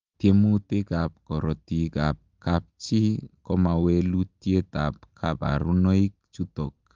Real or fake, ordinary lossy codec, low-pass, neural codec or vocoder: real; Opus, 32 kbps; 7.2 kHz; none